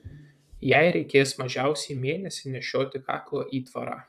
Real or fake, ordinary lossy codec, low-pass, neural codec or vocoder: fake; AAC, 96 kbps; 14.4 kHz; vocoder, 44.1 kHz, 128 mel bands, Pupu-Vocoder